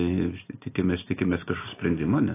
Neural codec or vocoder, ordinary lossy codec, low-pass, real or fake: none; AAC, 24 kbps; 3.6 kHz; real